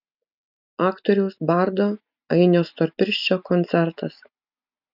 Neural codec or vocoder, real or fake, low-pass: none; real; 5.4 kHz